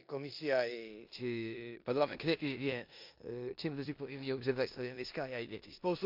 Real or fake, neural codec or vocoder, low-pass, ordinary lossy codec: fake; codec, 16 kHz in and 24 kHz out, 0.9 kbps, LongCat-Audio-Codec, four codebook decoder; 5.4 kHz; MP3, 48 kbps